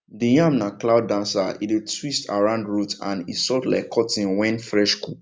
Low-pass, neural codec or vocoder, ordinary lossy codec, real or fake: none; none; none; real